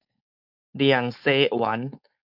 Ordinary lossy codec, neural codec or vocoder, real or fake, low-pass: AAC, 48 kbps; codec, 16 kHz, 4.8 kbps, FACodec; fake; 5.4 kHz